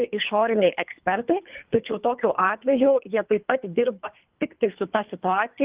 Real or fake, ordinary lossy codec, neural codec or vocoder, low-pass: fake; Opus, 24 kbps; codec, 24 kHz, 3 kbps, HILCodec; 3.6 kHz